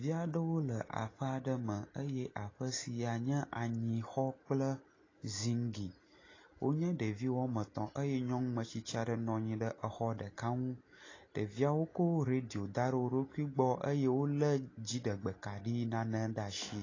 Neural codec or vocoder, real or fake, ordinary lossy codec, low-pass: none; real; AAC, 32 kbps; 7.2 kHz